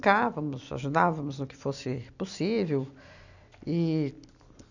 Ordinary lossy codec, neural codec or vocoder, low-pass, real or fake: none; none; 7.2 kHz; real